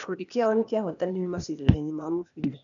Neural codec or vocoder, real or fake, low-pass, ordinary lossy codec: codec, 16 kHz, 0.8 kbps, ZipCodec; fake; 7.2 kHz; none